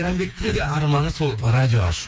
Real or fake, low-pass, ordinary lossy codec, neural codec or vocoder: fake; none; none; codec, 16 kHz, 4 kbps, FreqCodec, smaller model